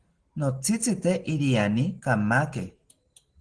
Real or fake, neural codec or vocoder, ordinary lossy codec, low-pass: real; none; Opus, 16 kbps; 9.9 kHz